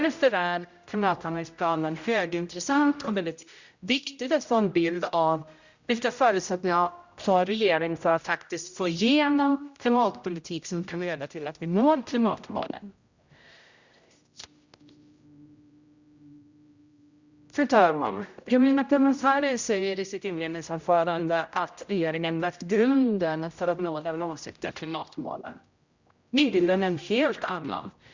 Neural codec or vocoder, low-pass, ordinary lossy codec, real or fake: codec, 16 kHz, 0.5 kbps, X-Codec, HuBERT features, trained on general audio; 7.2 kHz; Opus, 64 kbps; fake